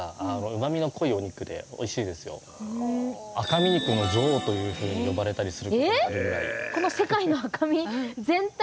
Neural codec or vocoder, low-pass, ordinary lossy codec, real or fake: none; none; none; real